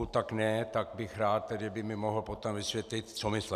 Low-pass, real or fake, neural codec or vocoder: 14.4 kHz; real; none